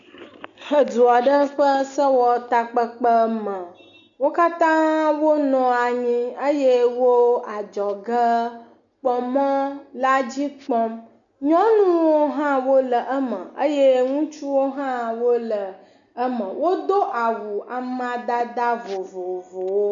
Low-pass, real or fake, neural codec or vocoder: 7.2 kHz; real; none